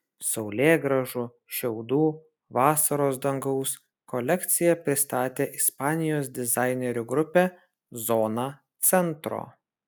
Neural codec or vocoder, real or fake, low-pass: none; real; 19.8 kHz